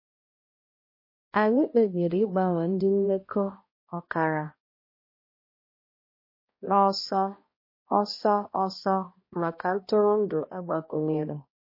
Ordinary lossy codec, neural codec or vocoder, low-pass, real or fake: MP3, 24 kbps; codec, 16 kHz, 1 kbps, X-Codec, HuBERT features, trained on balanced general audio; 5.4 kHz; fake